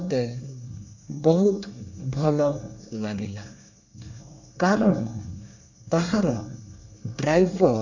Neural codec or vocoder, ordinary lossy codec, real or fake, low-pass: codec, 24 kHz, 1 kbps, SNAC; none; fake; 7.2 kHz